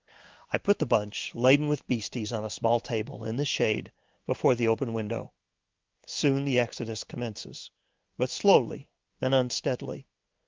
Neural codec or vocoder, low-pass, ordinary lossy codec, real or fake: codec, 16 kHz in and 24 kHz out, 1 kbps, XY-Tokenizer; 7.2 kHz; Opus, 32 kbps; fake